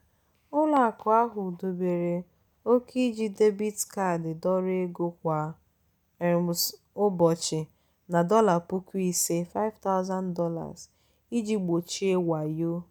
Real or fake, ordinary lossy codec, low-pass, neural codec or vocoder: real; none; none; none